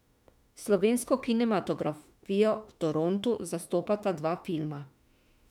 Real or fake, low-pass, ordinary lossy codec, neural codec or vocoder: fake; 19.8 kHz; none; autoencoder, 48 kHz, 32 numbers a frame, DAC-VAE, trained on Japanese speech